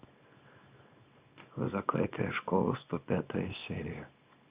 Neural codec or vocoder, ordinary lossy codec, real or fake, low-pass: codec, 24 kHz, 0.9 kbps, WavTokenizer, small release; Opus, 64 kbps; fake; 3.6 kHz